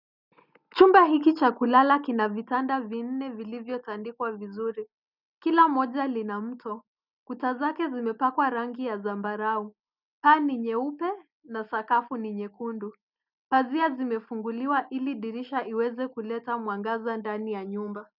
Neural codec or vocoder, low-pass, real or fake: none; 5.4 kHz; real